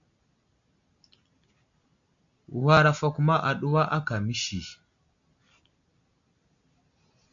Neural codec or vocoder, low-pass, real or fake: none; 7.2 kHz; real